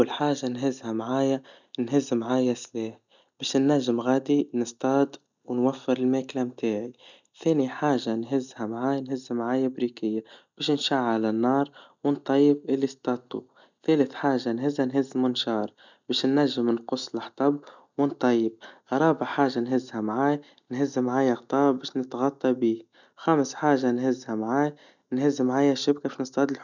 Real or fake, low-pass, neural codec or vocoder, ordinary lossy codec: real; 7.2 kHz; none; none